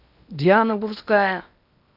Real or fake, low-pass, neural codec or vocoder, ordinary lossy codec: fake; 5.4 kHz; codec, 16 kHz in and 24 kHz out, 0.8 kbps, FocalCodec, streaming, 65536 codes; none